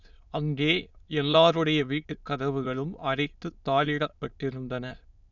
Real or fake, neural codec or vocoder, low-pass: fake; autoencoder, 22.05 kHz, a latent of 192 numbers a frame, VITS, trained on many speakers; 7.2 kHz